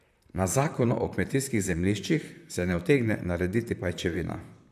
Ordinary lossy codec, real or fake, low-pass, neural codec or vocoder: none; fake; 14.4 kHz; vocoder, 44.1 kHz, 128 mel bands, Pupu-Vocoder